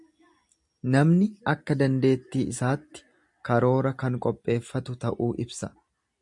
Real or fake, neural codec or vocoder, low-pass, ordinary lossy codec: real; none; 10.8 kHz; MP3, 96 kbps